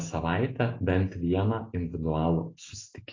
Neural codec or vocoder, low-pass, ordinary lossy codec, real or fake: none; 7.2 kHz; AAC, 32 kbps; real